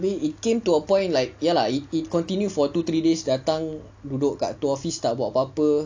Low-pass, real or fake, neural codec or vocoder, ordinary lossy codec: 7.2 kHz; real; none; AAC, 48 kbps